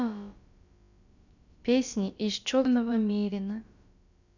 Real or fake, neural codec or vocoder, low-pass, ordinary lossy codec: fake; codec, 16 kHz, about 1 kbps, DyCAST, with the encoder's durations; 7.2 kHz; none